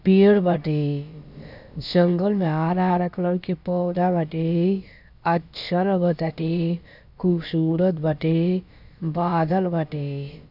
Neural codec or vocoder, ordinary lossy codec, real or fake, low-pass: codec, 16 kHz, about 1 kbps, DyCAST, with the encoder's durations; none; fake; 5.4 kHz